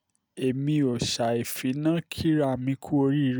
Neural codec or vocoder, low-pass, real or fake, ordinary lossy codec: none; none; real; none